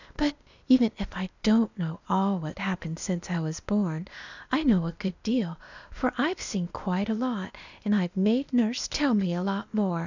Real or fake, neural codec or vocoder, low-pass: fake; codec, 16 kHz, 0.8 kbps, ZipCodec; 7.2 kHz